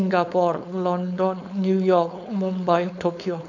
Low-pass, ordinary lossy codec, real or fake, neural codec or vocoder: 7.2 kHz; none; fake; codec, 16 kHz, 4.8 kbps, FACodec